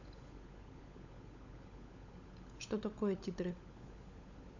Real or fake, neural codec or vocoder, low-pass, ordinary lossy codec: fake; codec, 16 kHz, 16 kbps, FunCodec, trained on LibriTTS, 50 frames a second; 7.2 kHz; AAC, 48 kbps